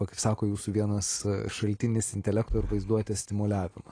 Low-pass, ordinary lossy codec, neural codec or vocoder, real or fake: 9.9 kHz; AAC, 32 kbps; none; real